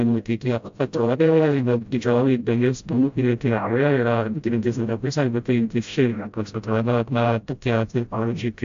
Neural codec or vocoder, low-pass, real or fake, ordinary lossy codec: codec, 16 kHz, 0.5 kbps, FreqCodec, smaller model; 7.2 kHz; fake; AAC, 64 kbps